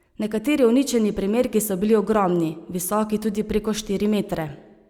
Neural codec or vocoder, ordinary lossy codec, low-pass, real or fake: none; Opus, 64 kbps; 19.8 kHz; real